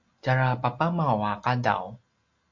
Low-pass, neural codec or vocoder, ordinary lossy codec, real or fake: 7.2 kHz; none; MP3, 48 kbps; real